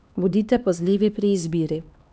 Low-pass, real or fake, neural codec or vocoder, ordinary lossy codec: none; fake; codec, 16 kHz, 2 kbps, X-Codec, HuBERT features, trained on LibriSpeech; none